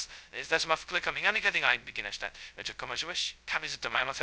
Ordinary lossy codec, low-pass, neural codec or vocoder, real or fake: none; none; codec, 16 kHz, 0.2 kbps, FocalCodec; fake